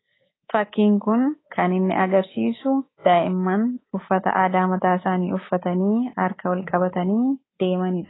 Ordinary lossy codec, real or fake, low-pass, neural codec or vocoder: AAC, 16 kbps; fake; 7.2 kHz; codec, 24 kHz, 3.1 kbps, DualCodec